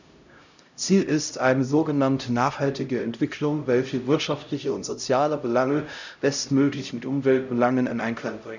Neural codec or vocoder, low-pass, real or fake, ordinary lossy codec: codec, 16 kHz, 0.5 kbps, X-Codec, HuBERT features, trained on LibriSpeech; 7.2 kHz; fake; none